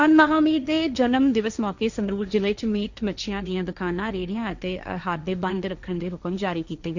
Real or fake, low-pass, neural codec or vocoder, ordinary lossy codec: fake; 7.2 kHz; codec, 16 kHz, 1.1 kbps, Voila-Tokenizer; none